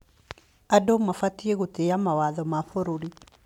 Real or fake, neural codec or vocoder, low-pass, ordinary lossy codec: real; none; 19.8 kHz; MP3, 96 kbps